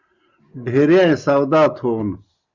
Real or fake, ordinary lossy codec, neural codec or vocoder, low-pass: real; Opus, 64 kbps; none; 7.2 kHz